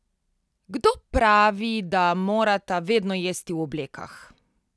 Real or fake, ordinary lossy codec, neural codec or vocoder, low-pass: real; none; none; none